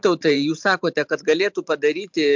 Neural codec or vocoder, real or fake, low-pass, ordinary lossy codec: vocoder, 44.1 kHz, 128 mel bands every 512 samples, BigVGAN v2; fake; 7.2 kHz; MP3, 64 kbps